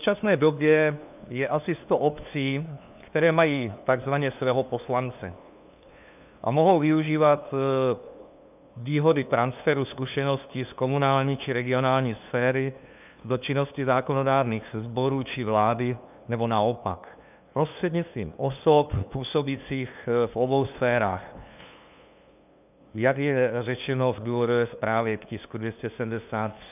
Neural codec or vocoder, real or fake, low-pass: codec, 16 kHz, 2 kbps, FunCodec, trained on LibriTTS, 25 frames a second; fake; 3.6 kHz